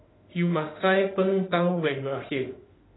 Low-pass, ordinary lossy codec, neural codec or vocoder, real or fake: 7.2 kHz; AAC, 16 kbps; autoencoder, 48 kHz, 32 numbers a frame, DAC-VAE, trained on Japanese speech; fake